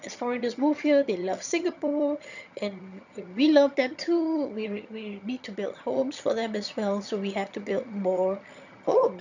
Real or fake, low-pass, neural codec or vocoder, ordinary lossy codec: fake; 7.2 kHz; vocoder, 22.05 kHz, 80 mel bands, HiFi-GAN; none